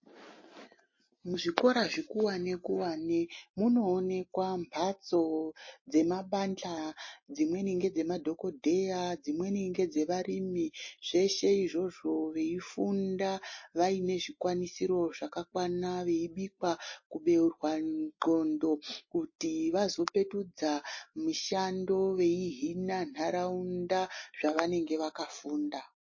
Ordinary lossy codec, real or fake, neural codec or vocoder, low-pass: MP3, 32 kbps; real; none; 7.2 kHz